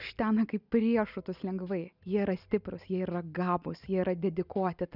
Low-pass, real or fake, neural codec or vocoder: 5.4 kHz; real; none